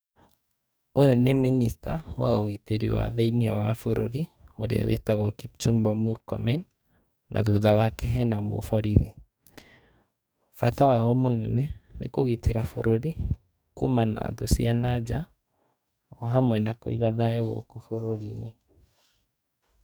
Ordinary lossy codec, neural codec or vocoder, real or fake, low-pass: none; codec, 44.1 kHz, 2.6 kbps, DAC; fake; none